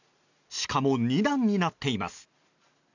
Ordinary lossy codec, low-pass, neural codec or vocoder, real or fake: none; 7.2 kHz; none; real